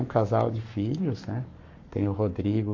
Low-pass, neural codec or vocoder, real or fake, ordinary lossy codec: 7.2 kHz; codec, 44.1 kHz, 7.8 kbps, Pupu-Codec; fake; MP3, 48 kbps